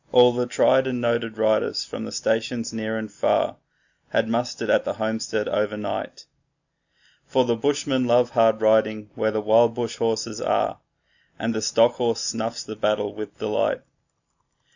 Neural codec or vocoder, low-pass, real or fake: none; 7.2 kHz; real